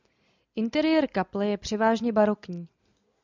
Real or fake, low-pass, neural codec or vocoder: real; 7.2 kHz; none